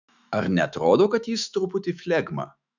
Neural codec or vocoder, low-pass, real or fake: autoencoder, 48 kHz, 128 numbers a frame, DAC-VAE, trained on Japanese speech; 7.2 kHz; fake